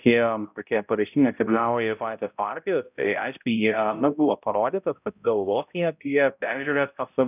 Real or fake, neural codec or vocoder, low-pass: fake; codec, 16 kHz, 0.5 kbps, X-Codec, HuBERT features, trained on balanced general audio; 3.6 kHz